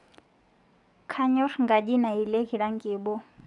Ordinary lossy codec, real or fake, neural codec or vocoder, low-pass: none; fake; codec, 44.1 kHz, 7.8 kbps, DAC; 10.8 kHz